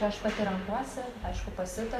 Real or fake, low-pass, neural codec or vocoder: fake; 14.4 kHz; vocoder, 44.1 kHz, 128 mel bands every 512 samples, BigVGAN v2